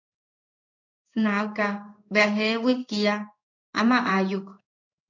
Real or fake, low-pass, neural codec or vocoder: fake; 7.2 kHz; codec, 16 kHz in and 24 kHz out, 1 kbps, XY-Tokenizer